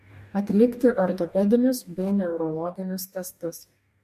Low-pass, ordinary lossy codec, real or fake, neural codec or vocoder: 14.4 kHz; MP3, 64 kbps; fake; codec, 44.1 kHz, 2.6 kbps, DAC